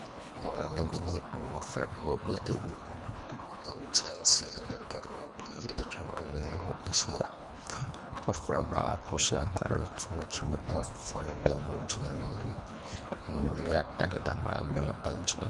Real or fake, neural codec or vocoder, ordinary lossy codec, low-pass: fake; codec, 24 kHz, 1.5 kbps, HILCodec; Opus, 64 kbps; 10.8 kHz